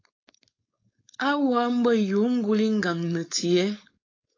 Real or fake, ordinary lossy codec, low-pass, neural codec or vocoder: fake; AAC, 32 kbps; 7.2 kHz; codec, 16 kHz, 4.8 kbps, FACodec